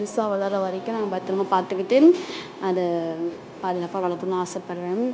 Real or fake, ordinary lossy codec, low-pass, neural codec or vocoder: fake; none; none; codec, 16 kHz, 0.9 kbps, LongCat-Audio-Codec